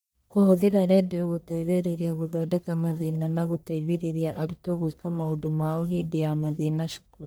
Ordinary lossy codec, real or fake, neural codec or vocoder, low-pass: none; fake; codec, 44.1 kHz, 1.7 kbps, Pupu-Codec; none